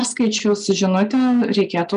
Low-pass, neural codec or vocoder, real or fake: 9.9 kHz; none; real